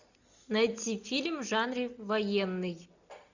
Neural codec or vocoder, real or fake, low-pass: none; real; 7.2 kHz